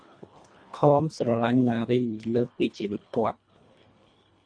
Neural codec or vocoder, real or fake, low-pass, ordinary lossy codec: codec, 24 kHz, 1.5 kbps, HILCodec; fake; 9.9 kHz; MP3, 48 kbps